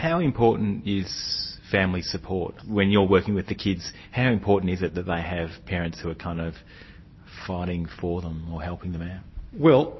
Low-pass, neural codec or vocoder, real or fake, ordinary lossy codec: 7.2 kHz; none; real; MP3, 24 kbps